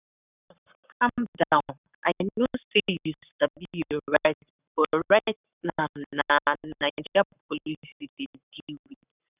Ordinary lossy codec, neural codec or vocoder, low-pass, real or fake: none; codec, 44.1 kHz, 7.8 kbps, Pupu-Codec; 3.6 kHz; fake